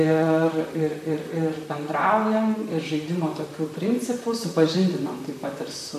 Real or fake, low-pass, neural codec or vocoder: fake; 14.4 kHz; vocoder, 44.1 kHz, 128 mel bands, Pupu-Vocoder